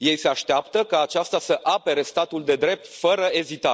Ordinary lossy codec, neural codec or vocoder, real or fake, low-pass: none; none; real; none